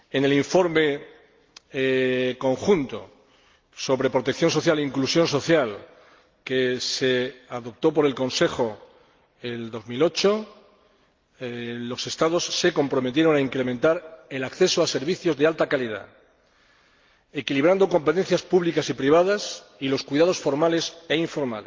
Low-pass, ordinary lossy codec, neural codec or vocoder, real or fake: 7.2 kHz; Opus, 32 kbps; none; real